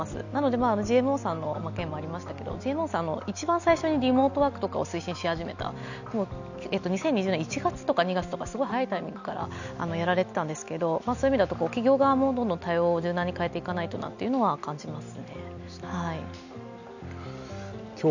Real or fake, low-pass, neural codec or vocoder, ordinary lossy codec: real; 7.2 kHz; none; none